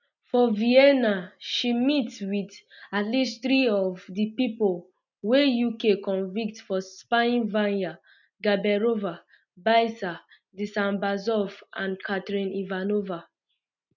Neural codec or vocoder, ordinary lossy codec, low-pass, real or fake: none; none; 7.2 kHz; real